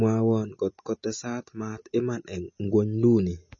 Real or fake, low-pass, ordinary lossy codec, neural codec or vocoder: real; 7.2 kHz; MP3, 32 kbps; none